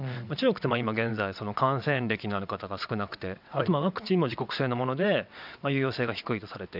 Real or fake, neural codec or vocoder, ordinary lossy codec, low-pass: fake; vocoder, 22.05 kHz, 80 mel bands, WaveNeXt; none; 5.4 kHz